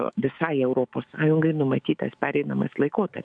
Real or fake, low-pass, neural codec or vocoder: fake; 9.9 kHz; autoencoder, 48 kHz, 128 numbers a frame, DAC-VAE, trained on Japanese speech